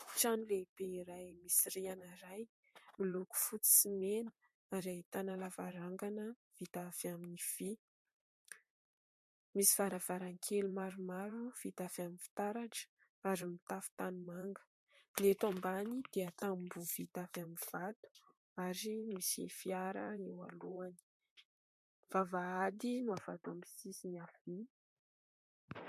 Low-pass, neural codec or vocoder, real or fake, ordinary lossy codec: 14.4 kHz; vocoder, 44.1 kHz, 128 mel bands, Pupu-Vocoder; fake; MP3, 64 kbps